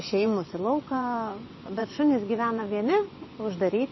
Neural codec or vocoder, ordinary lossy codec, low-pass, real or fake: vocoder, 24 kHz, 100 mel bands, Vocos; MP3, 24 kbps; 7.2 kHz; fake